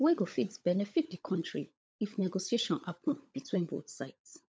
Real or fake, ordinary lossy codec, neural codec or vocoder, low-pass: fake; none; codec, 16 kHz, 16 kbps, FunCodec, trained on LibriTTS, 50 frames a second; none